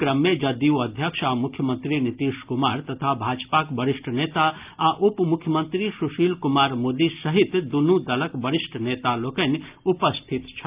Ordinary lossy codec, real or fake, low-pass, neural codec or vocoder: Opus, 24 kbps; real; 3.6 kHz; none